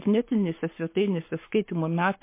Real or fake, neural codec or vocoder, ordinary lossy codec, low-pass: fake; codec, 44.1 kHz, 7.8 kbps, Pupu-Codec; MP3, 24 kbps; 3.6 kHz